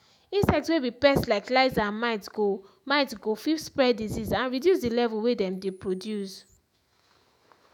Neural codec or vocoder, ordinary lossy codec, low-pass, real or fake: autoencoder, 48 kHz, 128 numbers a frame, DAC-VAE, trained on Japanese speech; none; 19.8 kHz; fake